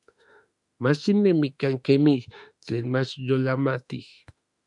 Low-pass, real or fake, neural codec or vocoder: 10.8 kHz; fake; autoencoder, 48 kHz, 32 numbers a frame, DAC-VAE, trained on Japanese speech